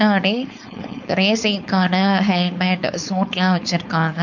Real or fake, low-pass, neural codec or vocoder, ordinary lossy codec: fake; 7.2 kHz; codec, 16 kHz, 4.8 kbps, FACodec; none